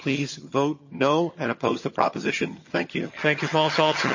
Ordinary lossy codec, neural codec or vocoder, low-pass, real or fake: MP3, 32 kbps; vocoder, 22.05 kHz, 80 mel bands, HiFi-GAN; 7.2 kHz; fake